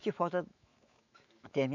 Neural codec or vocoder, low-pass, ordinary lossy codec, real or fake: autoencoder, 48 kHz, 128 numbers a frame, DAC-VAE, trained on Japanese speech; 7.2 kHz; none; fake